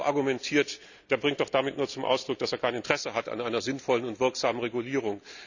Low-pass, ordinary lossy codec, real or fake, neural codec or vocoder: 7.2 kHz; none; real; none